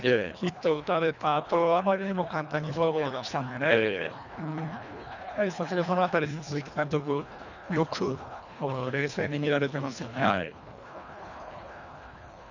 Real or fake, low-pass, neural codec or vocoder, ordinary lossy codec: fake; 7.2 kHz; codec, 24 kHz, 1.5 kbps, HILCodec; none